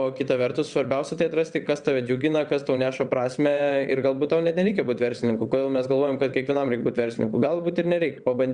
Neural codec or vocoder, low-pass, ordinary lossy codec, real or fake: vocoder, 22.05 kHz, 80 mel bands, WaveNeXt; 9.9 kHz; Opus, 64 kbps; fake